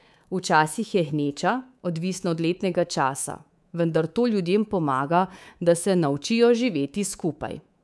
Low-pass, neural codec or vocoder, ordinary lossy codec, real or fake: none; codec, 24 kHz, 3.1 kbps, DualCodec; none; fake